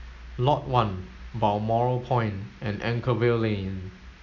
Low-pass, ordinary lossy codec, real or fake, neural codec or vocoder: 7.2 kHz; none; real; none